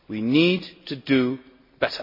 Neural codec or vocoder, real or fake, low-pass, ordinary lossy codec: none; real; 5.4 kHz; MP3, 24 kbps